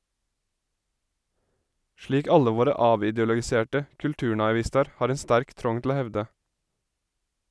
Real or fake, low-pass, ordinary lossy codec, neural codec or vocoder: real; none; none; none